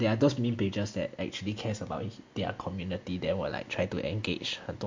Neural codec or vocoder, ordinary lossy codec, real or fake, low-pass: none; MP3, 48 kbps; real; 7.2 kHz